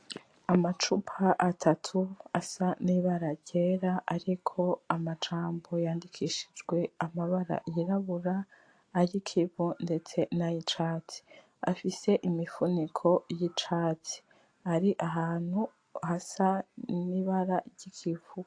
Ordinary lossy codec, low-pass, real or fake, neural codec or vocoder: AAC, 48 kbps; 9.9 kHz; real; none